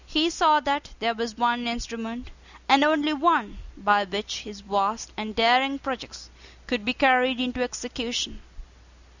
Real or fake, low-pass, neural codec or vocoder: real; 7.2 kHz; none